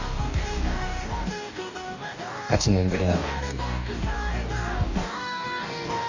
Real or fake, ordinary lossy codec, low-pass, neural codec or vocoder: fake; none; 7.2 kHz; codec, 44.1 kHz, 2.6 kbps, DAC